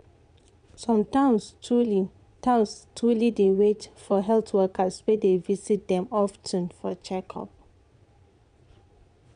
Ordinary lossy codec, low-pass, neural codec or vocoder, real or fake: none; 9.9 kHz; vocoder, 22.05 kHz, 80 mel bands, Vocos; fake